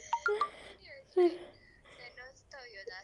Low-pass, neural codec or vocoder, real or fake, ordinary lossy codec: 10.8 kHz; none; real; Opus, 32 kbps